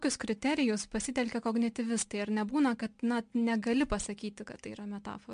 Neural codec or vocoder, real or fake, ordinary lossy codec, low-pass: none; real; MP3, 64 kbps; 9.9 kHz